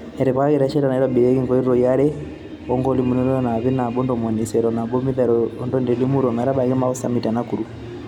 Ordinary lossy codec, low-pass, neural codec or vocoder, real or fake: none; 19.8 kHz; none; real